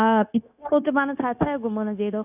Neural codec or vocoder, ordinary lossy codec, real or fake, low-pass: codec, 16 kHz, 0.9 kbps, LongCat-Audio-Codec; none; fake; 3.6 kHz